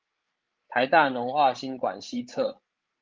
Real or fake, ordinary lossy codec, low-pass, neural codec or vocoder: fake; Opus, 32 kbps; 7.2 kHz; codec, 16 kHz, 8 kbps, FreqCodec, larger model